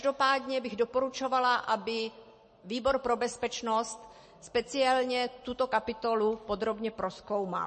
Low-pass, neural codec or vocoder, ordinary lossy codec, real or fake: 10.8 kHz; none; MP3, 32 kbps; real